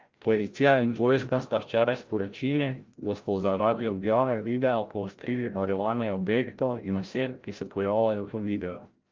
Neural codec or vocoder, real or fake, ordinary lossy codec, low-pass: codec, 16 kHz, 0.5 kbps, FreqCodec, larger model; fake; Opus, 32 kbps; 7.2 kHz